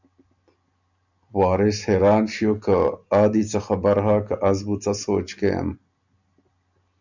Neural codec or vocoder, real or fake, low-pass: none; real; 7.2 kHz